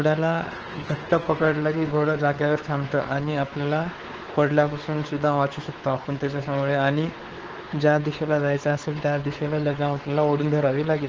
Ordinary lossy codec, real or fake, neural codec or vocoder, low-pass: Opus, 16 kbps; fake; codec, 16 kHz, 4 kbps, X-Codec, WavLM features, trained on Multilingual LibriSpeech; 7.2 kHz